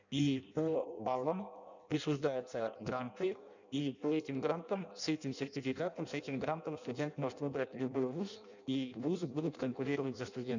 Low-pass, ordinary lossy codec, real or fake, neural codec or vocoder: 7.2 kHz; none; fake; codec, 16 kHz in and 24 kHz out, 0.6 kbps, FireRedTTS-2 codec